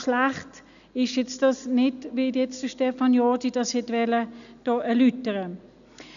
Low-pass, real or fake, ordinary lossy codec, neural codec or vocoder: 7.2 kHz; real; none; none